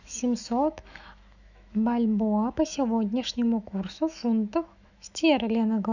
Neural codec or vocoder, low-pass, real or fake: autoencoder, 48 kHz, 128 numbers a frame, DAC-VAE, trained on Japanese speech; 7.2 kHz; fake